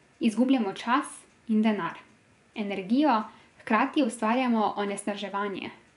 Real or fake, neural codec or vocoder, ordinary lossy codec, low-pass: fake; vocoder, 24 kHz, 100 mel bands, Vocos; none; 10.8 kHz